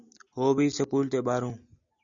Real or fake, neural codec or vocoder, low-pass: real; none; 7.2 kHz